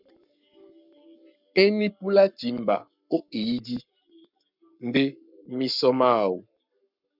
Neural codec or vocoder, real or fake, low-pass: codec, 44.1 kHz, 7.8 kbps, Pupu-Codec; fake; 5.4 kHz